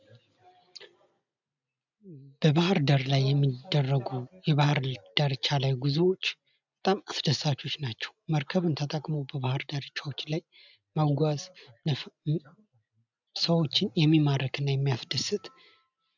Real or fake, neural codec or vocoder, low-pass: real; none; 7.2 kHz